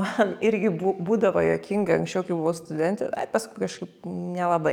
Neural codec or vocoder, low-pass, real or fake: codec, 44.1 kHz, 7.8 kbps, DAC; 19.8 kHz; fake